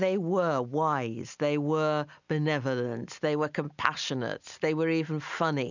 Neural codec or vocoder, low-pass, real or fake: none; 7.2 kHz; real